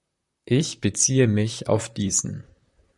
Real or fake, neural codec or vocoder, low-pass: fake; vocoder, 44.1 kHz, 128 mel bands, Pupu-Vocoder; 10.8 kHz